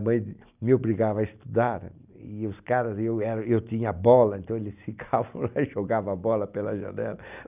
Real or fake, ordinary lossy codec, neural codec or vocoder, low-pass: real; none; none; 3.6 kHz